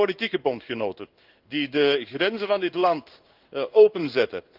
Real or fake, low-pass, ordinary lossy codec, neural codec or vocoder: fake; 5.4 kHz; Opus, 32 kbps; codec, 16 kHz in and 24 kHz out, 1 kbps, XY-Tokenizer